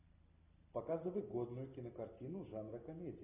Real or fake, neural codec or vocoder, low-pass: real; none; 3.6 kHz